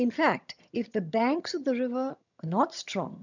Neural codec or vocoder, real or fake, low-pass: vocoder, 22.05 kHz, 80 mel bands, HiFi-GAN; fake; 7.2 kHz